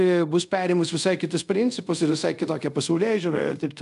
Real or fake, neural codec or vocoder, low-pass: fake; codec, 24 kHz, 0.5 kbps, DualCodec; 10.8 kHz